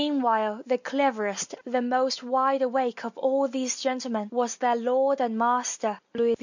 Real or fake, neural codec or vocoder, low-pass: real; none; 7.2 kHz